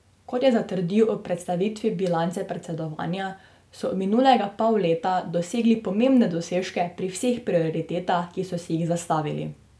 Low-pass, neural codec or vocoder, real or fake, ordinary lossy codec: none; none; real; none